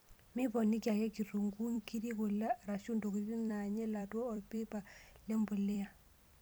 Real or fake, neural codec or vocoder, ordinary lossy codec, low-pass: fake; vocoder, 44.1 kHz, 128 mel bands every 256 samples, BigVGAN v2; none; none